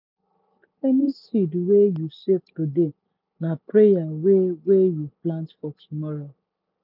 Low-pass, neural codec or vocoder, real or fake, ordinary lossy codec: 5.4 kHz; none; real; none